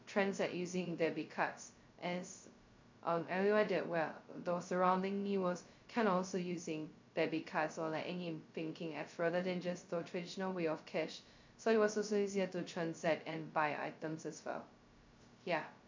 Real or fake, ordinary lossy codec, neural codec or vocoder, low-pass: fake; MP3, 48 kbps; codec, 16 kHz, 0.2 kbps, FocalCodec; 7.2 kHz